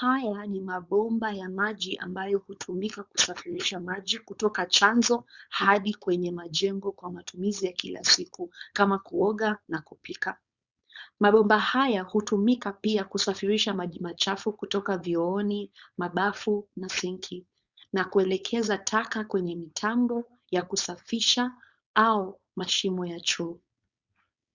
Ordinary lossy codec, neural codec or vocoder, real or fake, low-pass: Opus, 64 kbps; codec, 16 kHz, 4.8 kbps, FACodec; fake; 7.2 kHz